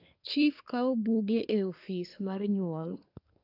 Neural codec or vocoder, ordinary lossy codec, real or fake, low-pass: codec, 24 kHz, 1 kbps, SNAC; AAC, 48 kbps; fake; 5.4 kHz